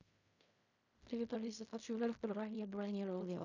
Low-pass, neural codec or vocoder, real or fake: 7.2 kHz; codec, 16 kHz in and 24 kHz out, 0.4 kbps, LongCat-Audio-Codec, fine tuned four codebook decoder; fake